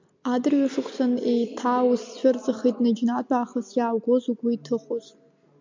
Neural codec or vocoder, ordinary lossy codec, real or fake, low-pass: none; MP3, 64 kbps; real; 7.2 kHz